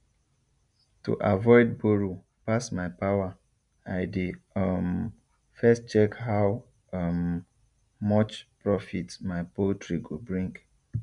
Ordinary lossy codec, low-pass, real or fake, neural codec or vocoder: none; 10.8 kHz; real; none